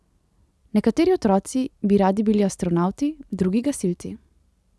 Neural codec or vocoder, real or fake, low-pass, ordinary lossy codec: none; real; none; none